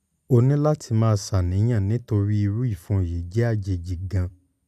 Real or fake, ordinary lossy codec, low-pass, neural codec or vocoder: real; none; 14.4 kHz; none